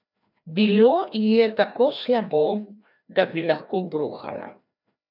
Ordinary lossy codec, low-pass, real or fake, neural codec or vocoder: none; 5.4 kHz; fake; codec, 16 kHz, 1 kbps, FreqCodec, larger model